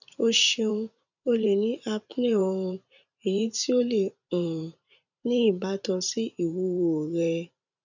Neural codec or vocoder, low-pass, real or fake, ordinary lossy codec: vocoder, 44.1 kHz, 128 mel bands every 512 samples, BigVGAN v2; 7.2 kHz; fake; none